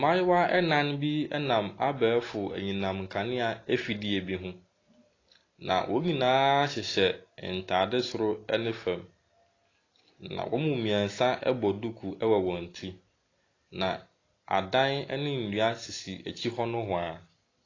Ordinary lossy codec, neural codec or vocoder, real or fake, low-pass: AAC, 32 kbps; none; real; 7.2 kHz